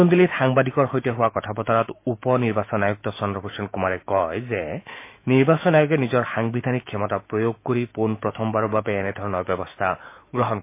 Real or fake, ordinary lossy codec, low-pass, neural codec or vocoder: fake; MP3, 24 kbps; 3.6 kHz; autoencoder, 48 kHz, 128 numbers a frame, DAC-VAE, trained on Japanese speech